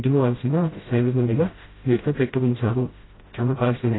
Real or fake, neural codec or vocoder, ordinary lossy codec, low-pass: fake; codec, 16 kHz, 0.5 kbps, FreqCodec, smaller model; AAC, 16 kbps; 7.2 kHz